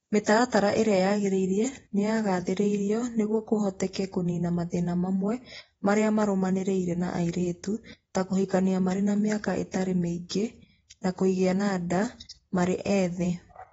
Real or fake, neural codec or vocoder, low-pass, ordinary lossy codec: fake; vocoder, 48 kHz, 128 mel bands, Vocos; 19.8 kHz; AAC, 24 kbps